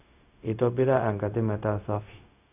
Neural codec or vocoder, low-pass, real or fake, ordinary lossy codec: codec, 16 kHz, 0.4 kbps, LongCat-Audio-Codec; 3.6 kHz; fake; none